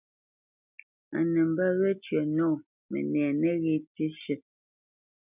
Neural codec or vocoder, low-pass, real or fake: none; 3.6 kHz; real